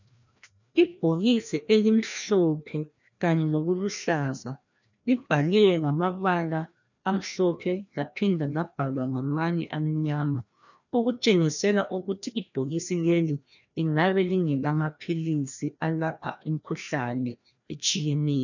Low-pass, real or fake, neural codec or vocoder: 7.2 kHz; fake; codec, 16 kHz, 1 kbps, FreqCodec, larger model